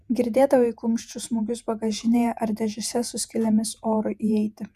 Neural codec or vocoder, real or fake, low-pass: vocoder, 48 kHz, 128 mel bands, Vocos; fake; 14.4 kHz